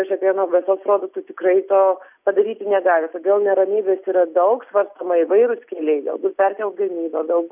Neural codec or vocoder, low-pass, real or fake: none; 3.6 kHz; real